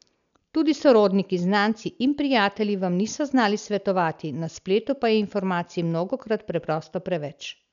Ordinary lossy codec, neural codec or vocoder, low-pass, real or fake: none; none; 7.2 kHz; real